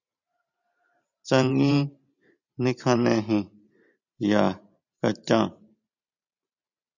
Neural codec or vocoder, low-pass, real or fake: vocoder, 44.1 kHz, 128 mel bands every 512 samples, BigVGAN v2; 7.2 kHz; fake